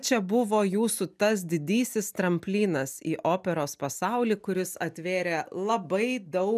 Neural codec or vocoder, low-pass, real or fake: none; 14.4 kHz; real